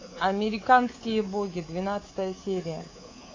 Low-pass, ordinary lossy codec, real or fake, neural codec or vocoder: 7.2 kHz; AAC, 32 kbps; fake; codec, 24 kHz, 3.1 kbps, DualCodec